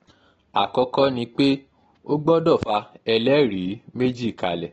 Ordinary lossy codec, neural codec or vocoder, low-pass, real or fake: AAC, 24 kbps; none; 19.8 kHz; real